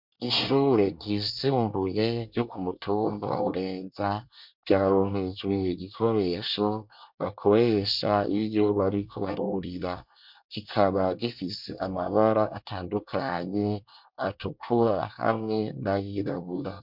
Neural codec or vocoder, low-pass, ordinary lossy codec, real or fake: codec, 24 kHz, 1 kbps, SNAC; 5.4 kHz; MP3, 48 kbps; fake